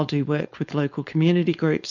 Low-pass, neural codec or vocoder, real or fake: 7.2 kHz; codec, 16 kHz, 4.8 kbps, FACodec; fake